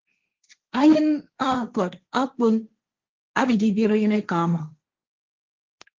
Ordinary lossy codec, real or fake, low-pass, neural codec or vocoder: Opus, 32 kbps; fake; 7.2 kHz; codec, 16 kHz, 1.1 kbps, Voila-Tokenizer